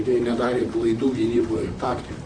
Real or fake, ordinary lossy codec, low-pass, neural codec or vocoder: fake; Opus, 64 kbps; 9.9 kHz; vocoder, 44.1 kHz, 128 mel bands, Pupu-Vocoder